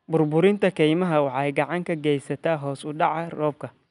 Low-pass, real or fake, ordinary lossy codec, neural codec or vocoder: 10.8 kHz; real; none; none